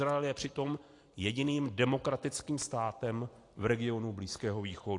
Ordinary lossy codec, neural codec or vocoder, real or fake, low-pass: AAC, 48 kbps; none; real; 10.8 kHz